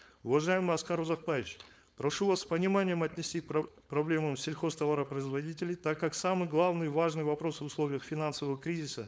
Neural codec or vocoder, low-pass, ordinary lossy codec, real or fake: codec, 16 kHz, 4.8 kbps, FACodec; none; none; fake